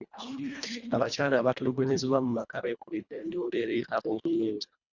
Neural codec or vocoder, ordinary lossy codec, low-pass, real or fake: codec, 24 kHz, 1.5 kbps, HILCodec; Opus, 64 kbps; 7.2 kHz; fake